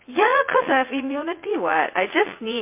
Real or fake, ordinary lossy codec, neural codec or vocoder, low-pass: fake; MP3, 24 kbps; vocoder, 44.1 kHz, 80 mel bands, Vocos; 3.6 kHz